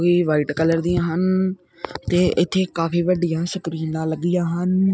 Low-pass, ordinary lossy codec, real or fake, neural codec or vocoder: none; none; real; none